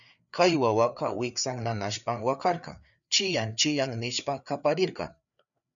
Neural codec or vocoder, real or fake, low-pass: codec, 16 kHz, 4 kbps, FreqCodec, larger model; fake; 7.2 kHz